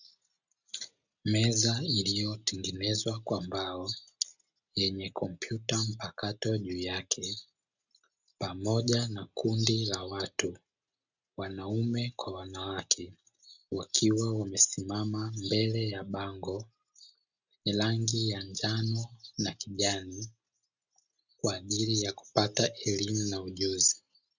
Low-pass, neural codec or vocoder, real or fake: 7.2 kHz; none; real